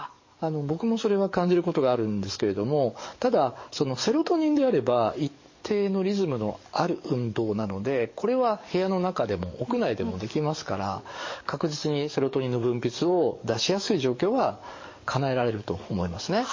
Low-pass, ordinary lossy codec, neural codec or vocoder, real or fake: 7.2 kHz; MP3, 32 kbps; codec, 44.1 kHz, 7.8 kbps, DAC; fake